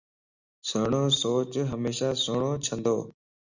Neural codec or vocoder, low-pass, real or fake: none; 7.2 kHz; real